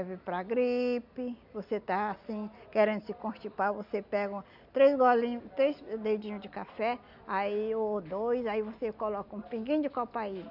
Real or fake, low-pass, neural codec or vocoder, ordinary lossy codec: real; 5.4 kHz; none; none